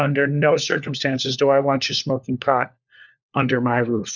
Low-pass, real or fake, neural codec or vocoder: 7.2 kHz; fake; codec, 16 kHz, 4 kbps, FunCodec, trained on LibriTTS, 50 frames a second